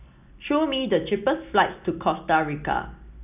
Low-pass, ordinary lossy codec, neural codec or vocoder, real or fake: 3.6 kHz; none; none; real